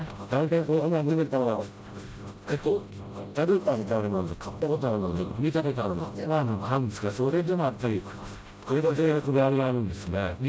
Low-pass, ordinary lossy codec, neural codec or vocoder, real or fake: none; none; codec, 16 kHz, 0.5 kbps, FreqCodec, smaller model; fake